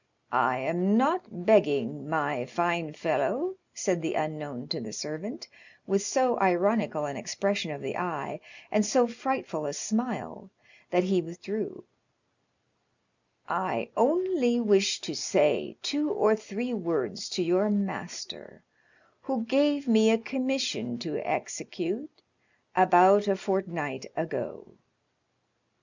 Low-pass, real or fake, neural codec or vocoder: 7.2 kHz; real; none